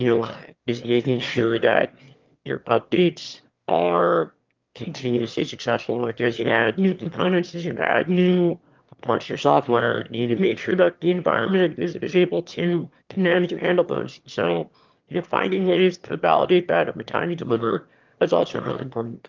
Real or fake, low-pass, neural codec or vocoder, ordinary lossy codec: fake; 7.2 kHz; autoencoder, 22.05 kHz, a latent of 192 numbers a frame, VITS, trained on one speaker; Opus, 32 kbps